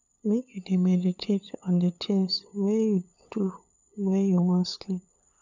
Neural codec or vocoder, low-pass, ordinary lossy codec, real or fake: codec, 16 kHz, 8 kbps, FunCodec, trained on LibriTTS, 25 frames a second; 7.2 kHz; none; fake